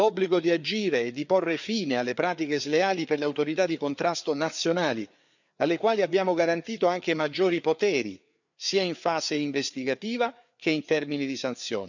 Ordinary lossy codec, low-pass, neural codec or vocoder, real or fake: none; 7.2 kHz; codec, 16 kHz, 4 kbps, FreqCodec, larger model; fake